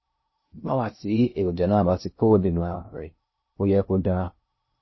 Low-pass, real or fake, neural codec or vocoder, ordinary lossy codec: 7.2 kHz; fake; codec, 16 kHz in and 24 kHz out, 0.6 kbps, FocalCodec, streaming, 2048 codes; MP3, 24 kbps